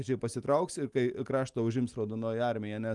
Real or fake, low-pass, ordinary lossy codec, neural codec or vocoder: real; 10.8 kHz; Opus, 32 kbps; none